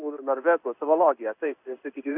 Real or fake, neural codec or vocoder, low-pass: fake; codec, 16 kHz in and 24 kHz out, 1 kbps, XY-Tokenizer; 3.6 kHz